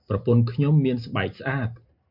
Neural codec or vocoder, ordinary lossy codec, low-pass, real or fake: none; MP3, 48 kbps; 5.4 kHz; real